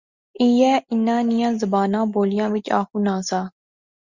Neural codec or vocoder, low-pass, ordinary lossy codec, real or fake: none; 7.2 kHz; Opus, 64 kbps; real